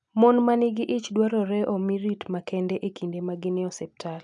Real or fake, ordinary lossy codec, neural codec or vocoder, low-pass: real; none; none; 10.8 kHz